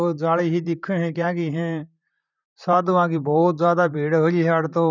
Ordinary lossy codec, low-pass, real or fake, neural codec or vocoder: none; 7.2 kHz; fake; vocoder, 44.1 kHz, 128 mel bands, Pupu-Vocoder